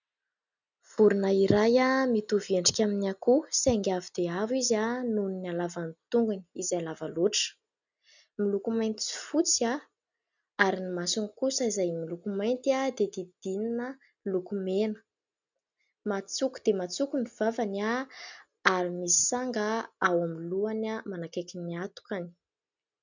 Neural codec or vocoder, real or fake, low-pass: none; real; 7.2 kHz